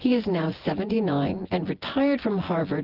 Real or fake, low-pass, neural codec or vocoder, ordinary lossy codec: fake; 5.4 kHz; vocoder, 24 kHz, 100 mel bands, Vocos; Opus, 16 kbps